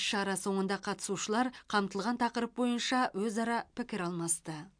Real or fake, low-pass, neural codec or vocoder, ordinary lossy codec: real; 9.9 kHz; none; MP3, 64 kbps